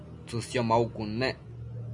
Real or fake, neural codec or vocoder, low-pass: real; none; 10.8 kHz